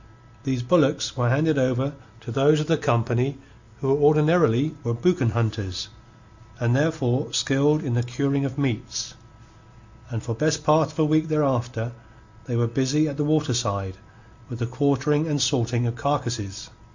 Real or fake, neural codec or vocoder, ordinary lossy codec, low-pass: real; none; Opus, 64 kbps; 7.2 kHz